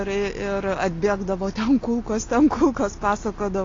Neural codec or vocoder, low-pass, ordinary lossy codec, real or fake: none; 7.2 kHz; AAC, 32 kbps; real